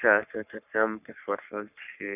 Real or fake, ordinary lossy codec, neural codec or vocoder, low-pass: fake; none; codec, 44.1 kHz, 3.4 kbps, Pupu-Codec; 3.6 kHz